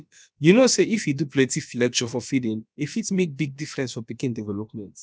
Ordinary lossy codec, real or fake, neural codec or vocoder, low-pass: none; fake; codec, 16 kHz, about 1 kbps, DyCAST, with the encoder's durations; none